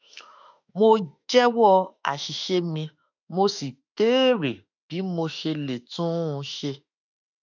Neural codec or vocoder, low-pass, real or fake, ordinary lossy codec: autoencoder, 48 kHz, 32 numbers a frame, DAC-VAE, trained on Japanese speech; 7.2 kHz; fake; none